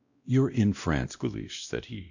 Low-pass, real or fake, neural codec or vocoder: 7.2 kHz; fake; codec, 16 kHz, 1 kbps, X-Codec, WavLM features, trained on Multilingual LibriSpeech